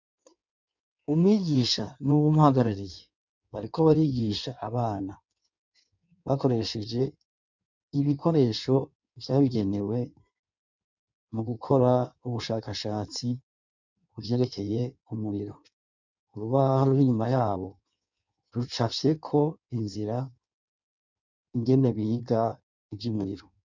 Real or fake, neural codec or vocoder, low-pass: fake; codec, 16 kHz in and 24 kHz out, 1.1 kbps, FireRedTTS-2 codec; 7.2 kHz